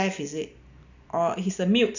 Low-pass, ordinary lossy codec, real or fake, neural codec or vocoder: 7.2 kHz; none; real; none